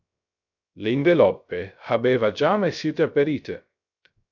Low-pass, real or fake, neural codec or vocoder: 7.2 kHz; fake; codec, 16 kHz, 0.3 kbps, FocalCodec